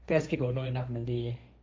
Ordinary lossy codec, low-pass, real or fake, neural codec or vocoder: none; none; fake; codec, 16 kHz, 1.1 kbps, Voila-Tokenizer